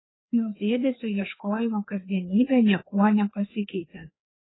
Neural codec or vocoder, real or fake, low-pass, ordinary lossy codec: codec, 16 kHz, 2 kbps, FreqCodec, larger model; fake; 7.2 kHz; AAC, 16 kbps